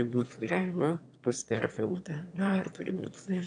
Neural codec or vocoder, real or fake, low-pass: autoencoder, 22.05 kHz, a latent of 192 numbers a frame, VITS, trained on one speaker; fake; 9.9 kHz